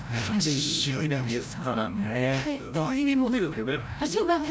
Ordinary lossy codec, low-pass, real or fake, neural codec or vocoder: none; none; fake; codec, 16 kHz, 0.5 kbps, FreqCodec, larger model